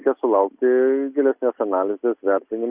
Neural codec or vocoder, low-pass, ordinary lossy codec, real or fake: none; 3.6 kHz; Opus, 64 kbps; real